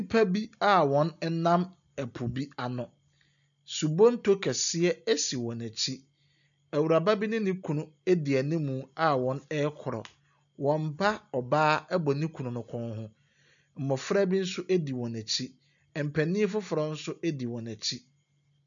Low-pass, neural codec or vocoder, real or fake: 7.2 kHz; none; real